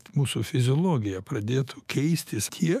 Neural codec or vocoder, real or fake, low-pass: autoencoder, 48 kHz, 128 numbers a frame, DAC-VAE, trained on Japanese speech; fake; 14.4 kHz